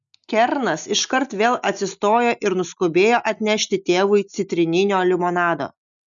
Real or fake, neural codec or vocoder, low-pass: real; none; 7.2 kHz